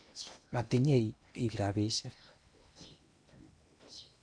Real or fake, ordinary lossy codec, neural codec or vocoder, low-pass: fake; none; codec, 16 kHz in and 24 kHz out, 0.8 kbps, FocalCodec, streaming, 65536 codes; 9.9 kHz